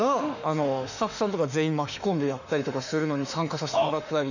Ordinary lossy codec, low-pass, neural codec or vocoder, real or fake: none; 7.2 kHz; autoencoder, 48 kHz, 32 numbers a frame, DAC-VAE, trained on Japanese speech; fake